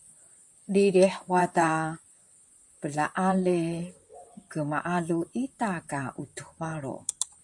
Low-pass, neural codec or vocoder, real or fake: 10.8 kHz; vocoder, 44.1 kHz, 128 mel bands, Pupu-Vocoder; fake